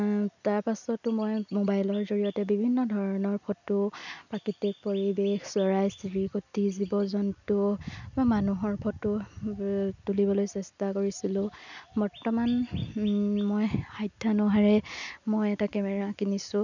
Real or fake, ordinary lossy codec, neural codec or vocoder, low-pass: real; none; none; 7.2 kHz